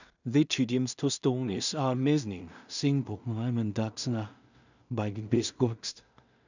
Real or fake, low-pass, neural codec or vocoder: fake; 7.2 kHz; codec, 16 kHz in and 24 kHz out, 0.4 kbps, LongCat-Audio-Codec, two codebook decoder